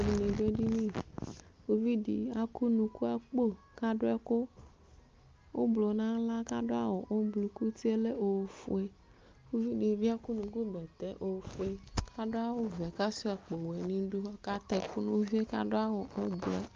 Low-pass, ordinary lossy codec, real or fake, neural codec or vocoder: 7.2 kHz; Opus, 24 kbps; real; none